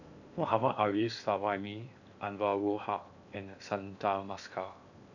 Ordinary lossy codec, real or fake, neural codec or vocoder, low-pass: none; fake; codec, 16 kHz in and 24 kHz out, 0.8 kbps, FocalCodec, streaming, 65536 codes; 7.2 kHz